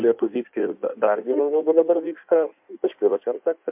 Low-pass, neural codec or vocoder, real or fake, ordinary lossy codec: 3.6 kHz; codec, 16 kHz in and 24 kHz out, 1.1 kbps, FireRedTTS-2 codec; fake; MP3, 32 kbps